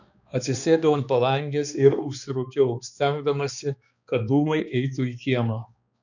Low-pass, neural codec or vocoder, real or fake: 7.2 kHz; codec, 16 kHz, 2 kbps, X-Codec, HuBERT features, trained on balanced general audio; fake